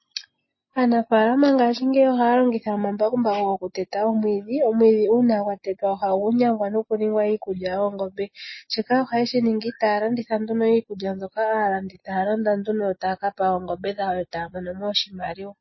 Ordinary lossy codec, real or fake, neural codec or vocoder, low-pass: MP3, 24 kbps; real; none; 7.2 kHz